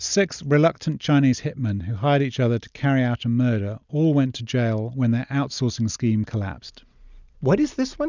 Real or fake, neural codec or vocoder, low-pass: real; none; 7.2 kHz